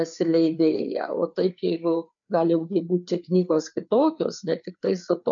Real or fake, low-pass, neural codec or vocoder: fake; 7.2 kHz; codec, 16 kHz, 4 kbps, FreqCodec, larger model